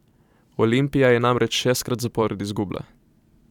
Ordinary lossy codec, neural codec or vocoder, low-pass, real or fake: none; vocoder, 44.1 kHz, 128 mel bands every 512 samples, BigVGAN v2; 19.8 kHz; fake